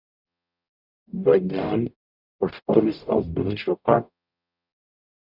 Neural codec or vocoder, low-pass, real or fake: codec, 44.1 kHz, 0.9 kbps, DAC; 5.4 kHz; fake